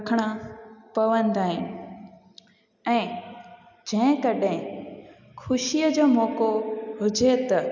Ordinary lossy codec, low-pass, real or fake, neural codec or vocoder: none; 7.2 kHz; real; none